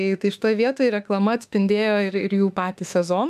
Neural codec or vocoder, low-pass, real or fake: autoencoder, 48 kHz, 32 numbers a frame, DAC-VAE, trained on Japanese speech; 14.4 kHz; fake